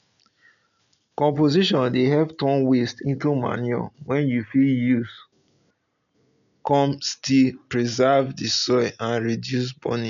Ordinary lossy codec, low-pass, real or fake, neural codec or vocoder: none; 7.2 kHz; real; none